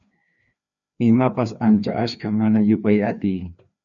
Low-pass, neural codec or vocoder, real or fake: 7.2 kHz; codec, 16 kHz, 2 kbps, FreqCodec, larger model; fake